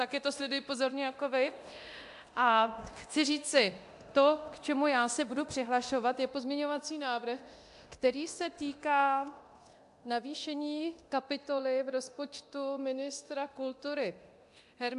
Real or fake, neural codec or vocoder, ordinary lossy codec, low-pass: fake; codec, 24 kHz, 0.9 kbps, DualCodec; AAC, 64 kbps; 10.8 kHz